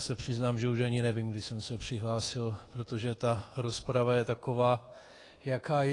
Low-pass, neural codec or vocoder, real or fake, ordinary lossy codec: 10.8 kHz; codec, 24 kHz, 1.2 kbps, DualCodec; fake; AAC, 32 kbps